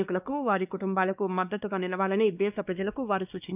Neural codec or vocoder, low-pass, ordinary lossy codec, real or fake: codec, 16 kHz, 2 kbps, X-Codec, WavLM features, trained on Multilingual LibriSpeech; 3.6 kHz; none; fake